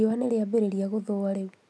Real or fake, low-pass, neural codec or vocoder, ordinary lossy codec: real; none; none; none